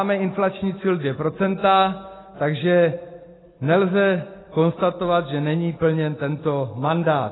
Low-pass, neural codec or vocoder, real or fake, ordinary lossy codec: 7.2 kHz; none; real; AAC, 16 kbps